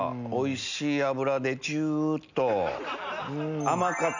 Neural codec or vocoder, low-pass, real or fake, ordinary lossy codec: none; 7.2 kHz; real; none